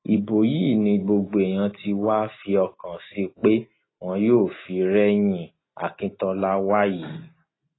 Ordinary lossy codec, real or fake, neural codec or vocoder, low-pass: AAC, 16 kbps; real; none; 7.2 kHz